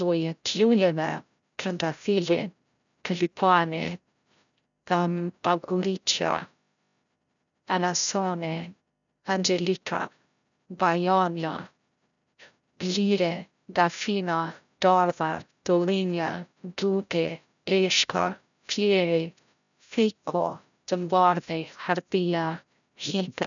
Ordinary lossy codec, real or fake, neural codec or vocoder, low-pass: none; fake; codec, 16 kHz, 0.5 kbps, FreqCodec, larger model; 7.2 kHz